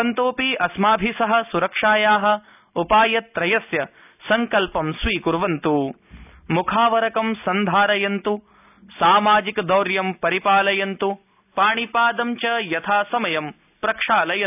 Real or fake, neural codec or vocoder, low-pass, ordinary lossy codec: real; none; 3.6 kHz; none